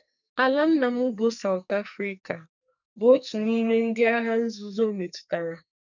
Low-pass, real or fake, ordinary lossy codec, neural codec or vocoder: 7.2 kHz; fake; none; codec, 44.1 kHz, 2.6 kbps, SNAC